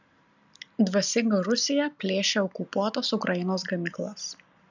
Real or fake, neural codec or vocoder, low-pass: real; none; 7.2 kHz